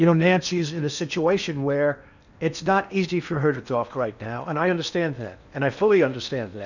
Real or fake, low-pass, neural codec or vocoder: fake; 7.2 kHz; codec, 16 kHz in and 24 kHz out, 0.8 kbps, FocalCodec, streaming, 65536 codes